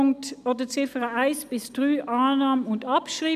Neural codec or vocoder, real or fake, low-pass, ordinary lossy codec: none; real; 14.4 kHz; none